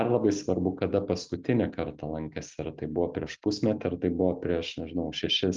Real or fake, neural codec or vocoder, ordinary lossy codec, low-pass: real; none; Opus, 24 kbps; 7.2 kHz